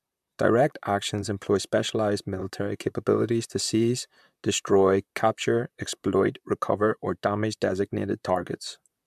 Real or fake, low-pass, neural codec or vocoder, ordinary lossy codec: fake; 14.4 kHz; vocoder, 44.1 kHz, 128 mel bands, Pupu-Vocoder; MP3, 96 kbps